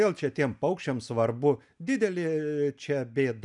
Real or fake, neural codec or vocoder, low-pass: real; none; 10.8 kHz